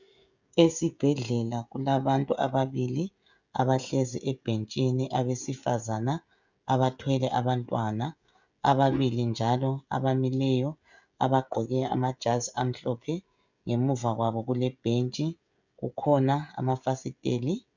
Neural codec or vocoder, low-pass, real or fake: codec, 16 kHz, 16 kbps, FreqCodec, smaller model; 7.2 kHz; fake